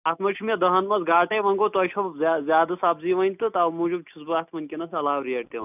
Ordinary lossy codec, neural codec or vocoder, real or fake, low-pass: none; none; real; 3.6 kHz